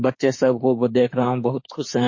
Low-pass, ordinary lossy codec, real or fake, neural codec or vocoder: 7.2 kHz; MP3, 32 kbps; fake; autoencoder, 48 kHz, 32 numbers a frame, DAC-VAE, trained on Japanese speech